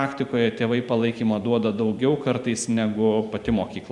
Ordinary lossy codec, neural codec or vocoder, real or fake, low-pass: Opus, 64 kbps; vocoder, 44.1 kHz, 128 mel bands every 512 samples, BigVGAN v2; fake; 10.8 kHz